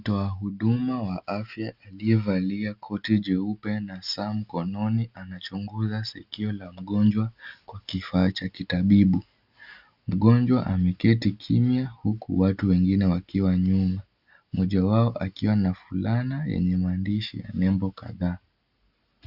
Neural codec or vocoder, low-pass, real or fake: none; 5.4 kHz; real